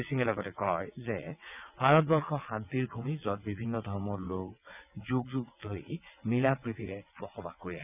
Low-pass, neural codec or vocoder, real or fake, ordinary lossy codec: 3.6 kHz; vocoder, 22.05 kHz, 80 mel bands, WaveNeXt; fake; none